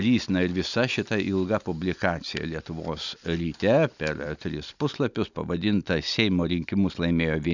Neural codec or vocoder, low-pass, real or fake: none; 7.2 kHz; real